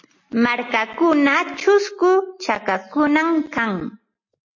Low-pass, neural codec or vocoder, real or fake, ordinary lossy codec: 7.2 kHz; none; real; MP3, 32 kbps